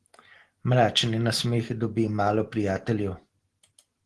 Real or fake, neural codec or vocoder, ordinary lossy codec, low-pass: real; none; Opus, 16 kbps; 10.8 kHz